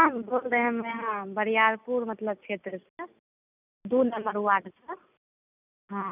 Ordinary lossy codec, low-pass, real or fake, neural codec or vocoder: none; 3.6 kHz; real; none